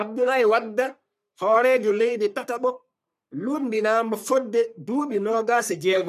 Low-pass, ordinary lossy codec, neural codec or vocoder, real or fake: 14.4 kHz; none; codec, 44.1 kHz, 3.4 kbps, Pupu-Codec; fake